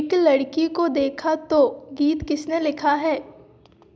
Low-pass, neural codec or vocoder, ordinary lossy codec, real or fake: none; none; none; real